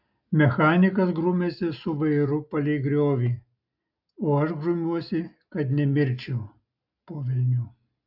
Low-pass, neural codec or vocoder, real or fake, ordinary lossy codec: 5.4 kHz; none; real; AAC, 48 kbps